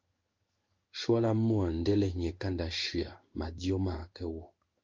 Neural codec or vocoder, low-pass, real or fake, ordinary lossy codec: codec, 16 kHz in and 24 kHz out, 1 kbps, XY-Tokenizer; 7.2 kHz; fake; Opus, 24 kbps